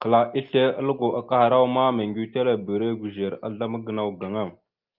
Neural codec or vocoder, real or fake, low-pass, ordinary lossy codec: none; real; 5.4 kHz; Opus, 24 kbps